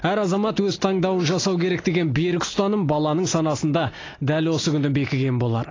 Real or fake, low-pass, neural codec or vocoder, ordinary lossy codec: real; 7.2 kHz; none; AAC, 32 kbps